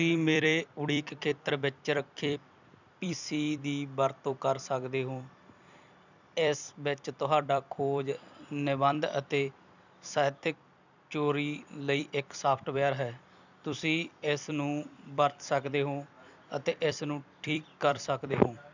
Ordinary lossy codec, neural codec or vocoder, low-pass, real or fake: none; vocoder, 44.1 kHz, 128 mel bands every 256 samples, BigVGAN v2; 7.2 kHz; fake